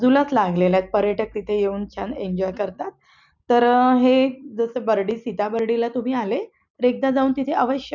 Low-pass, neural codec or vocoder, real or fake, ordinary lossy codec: 7.2 kHz; none; real; none